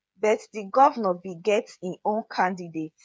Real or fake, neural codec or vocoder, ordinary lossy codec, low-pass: fake; codec, 16 kHz, 8 kbps, FreqCodec, smaller model; none; none